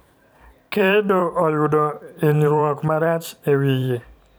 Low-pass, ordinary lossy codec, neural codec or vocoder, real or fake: none; none; vocoder, 44.1 kHz, 128 mel bands every 512 samples, BigVGAN v2; fake